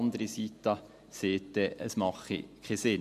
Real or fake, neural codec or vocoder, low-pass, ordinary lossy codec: real; none; 14.4 kHz; MP3, 64 kbps